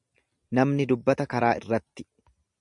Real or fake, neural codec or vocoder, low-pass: real; none; 10.8 kHz